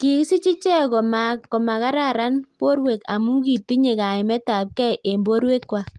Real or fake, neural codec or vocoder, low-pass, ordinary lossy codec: real; none; 10.8 kHz; Opus, 24 kbps